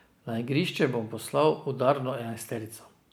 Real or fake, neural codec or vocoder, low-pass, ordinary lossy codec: real; none; none; none